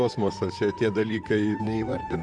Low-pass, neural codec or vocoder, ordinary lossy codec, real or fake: 9.9 kHz; vocoder, 44.1 kHz, 128 mel bands, Pupu-Vocoder; MP3, 96 kbps; fake